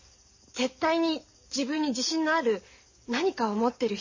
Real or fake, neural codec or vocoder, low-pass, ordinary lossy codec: fake; codec, 44.1 kHz, 7.8 kbps, DAC; 7.2 kHz; MP3, 32 kbps